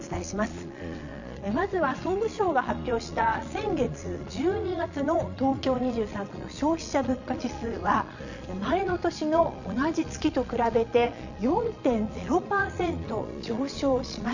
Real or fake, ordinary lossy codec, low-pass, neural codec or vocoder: fake; none; 7.2 kHz; vocoder, 22.05 kHz, 80 mel bands, Vocos